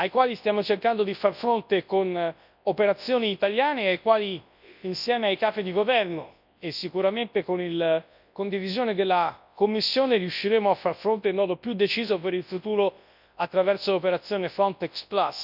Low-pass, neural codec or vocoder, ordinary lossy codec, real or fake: 5.4 kHz; codec, 24 kHz, 0.9 kbps, WavTokenizer, large speech release; none; fake